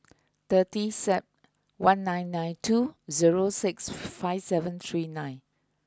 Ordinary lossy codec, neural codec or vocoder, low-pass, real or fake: none; none; none; real